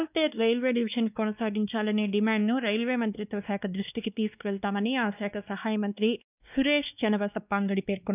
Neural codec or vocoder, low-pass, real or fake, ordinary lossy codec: codec, 16 kHz, 2 kbps, X-Codec, WavLM features, trained on Multilingual LibriSpeech; 3.6 kHz; fake; none